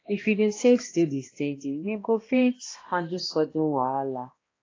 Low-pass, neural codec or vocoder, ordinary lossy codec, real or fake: 7.2 kHz; codec, 16 kHz, 1 kbps, X-Codec, HuBERT features, trained on balanced general audio; AAC, 32 kbps; fake